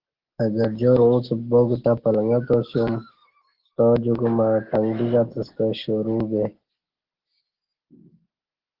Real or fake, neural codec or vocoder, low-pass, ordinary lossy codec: real; none; 5.4 kHz; Opus, 16 kbps